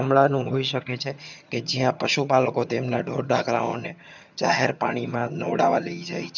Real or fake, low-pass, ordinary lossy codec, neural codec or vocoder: fake; 7.2 kHz; none; vocoder, 22.05 kHz, 80 mel bands, HiFi-GAN